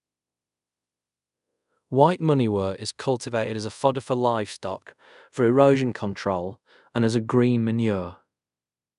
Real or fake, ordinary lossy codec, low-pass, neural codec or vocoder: fake; none; 10.8 kHz; codec, 24 kHz, 0.5 kbps, DualCodec